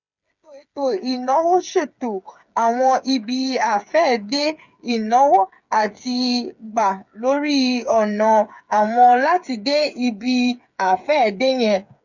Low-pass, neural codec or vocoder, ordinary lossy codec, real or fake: 7.2 kHz; codec, 16 kHz, 8 kbps, FreqCodec, smaller model; none; fake